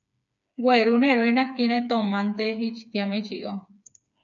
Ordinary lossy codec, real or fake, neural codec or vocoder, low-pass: MP3, 64 kbps; fake; codec, 16 kHz, 4 kbps, FreqCodec, smaller model; 7.2 kHz